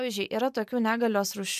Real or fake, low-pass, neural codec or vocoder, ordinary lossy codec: real; 14.4 kHz; none; MP3, 96 kbps